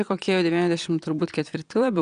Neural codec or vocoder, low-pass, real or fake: vocoder, 22.05 kHz, 80 mel bands, Vocos; 9.9 kHz; fake